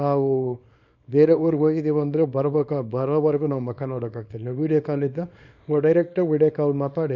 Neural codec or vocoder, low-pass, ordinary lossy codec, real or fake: codec, 24 kHz, 0.9 kbps, WavTokenizer, small release; 7.2 kHz; none; fake